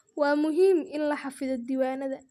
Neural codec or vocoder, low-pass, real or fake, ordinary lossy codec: none; 14.4 kHz; real; none